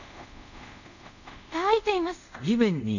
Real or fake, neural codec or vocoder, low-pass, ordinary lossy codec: fake; codec, 24 kHz, 0.5 kbps, DualCodec; 7.2 kHz; none